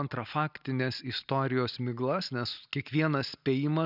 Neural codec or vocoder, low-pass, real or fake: none; 5.4 kHz; real